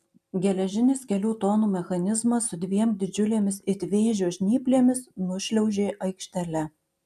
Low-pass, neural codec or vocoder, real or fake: 14.4 kHz; none; real